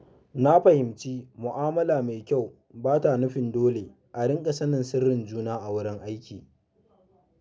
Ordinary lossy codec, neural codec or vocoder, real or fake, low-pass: none; none; real; none